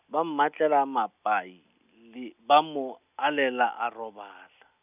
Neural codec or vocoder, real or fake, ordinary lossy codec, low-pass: none; real; none; 3.6 kHz